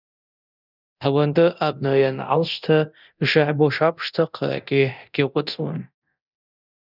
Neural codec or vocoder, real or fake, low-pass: codec, 24 kHz, 0.9 kbps, DualCodec; fake; 5.4 kHz